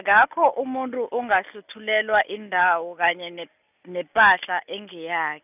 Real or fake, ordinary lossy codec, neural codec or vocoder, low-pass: real; none; none; 3.6 kHz